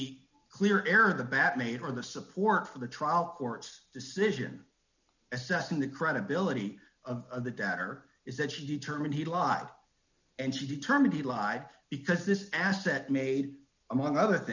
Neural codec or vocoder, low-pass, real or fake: none; 7.2 kHz; real